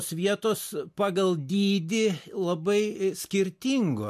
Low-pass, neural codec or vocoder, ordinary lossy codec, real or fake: 14.4 kHz; none; MP3, 64 kbps; real